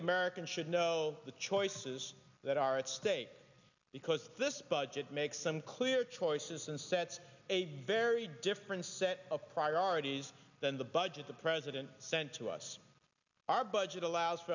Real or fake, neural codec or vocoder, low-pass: real; none; 7.2 kHz